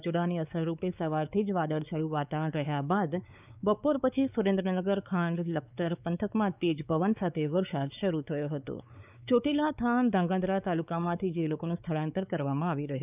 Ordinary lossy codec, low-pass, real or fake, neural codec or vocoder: none; 3.6 kHz; fake; codec, 16 kHz, 4 kbps, X-Codec, WavLM features, trained on Multilingual LibriSpeech